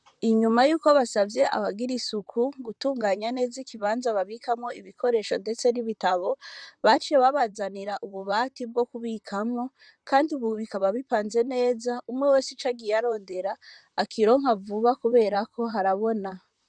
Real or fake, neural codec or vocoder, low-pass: fake; vocoder, 44.1 kHz, 128 mel bands, Pupu-Vocoder; 9.9 kHz